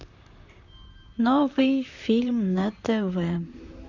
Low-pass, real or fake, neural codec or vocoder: 7.2 kHz; fake; vocoder, 44.1 kHz, 128 mel bands, Pupu-Vocoder